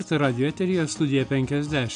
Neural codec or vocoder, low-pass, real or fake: none; 9.9 kHz; real